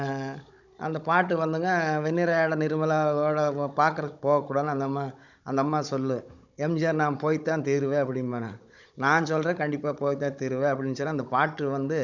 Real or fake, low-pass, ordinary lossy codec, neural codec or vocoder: fake; 7.2 kHz; none; codec, 16 kHz, 16 kbps, FunCodec, trained on Chinese and English, 50 frames a second